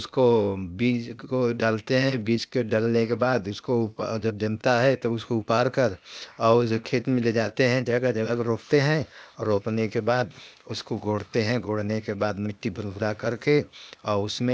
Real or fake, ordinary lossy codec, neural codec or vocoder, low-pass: fake; none; codec, 16 kHz, 0.8 kbps, ZipCodec; none